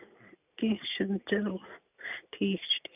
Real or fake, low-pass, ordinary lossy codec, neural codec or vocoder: real; 3.6 kHz; none; none